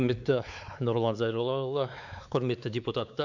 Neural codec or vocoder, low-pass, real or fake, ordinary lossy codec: codec, 16 kHz, 4 kbps, X-Codec, WavLM features, trained on Multilingual LibriSpeech; 7.2 kHz; fake; none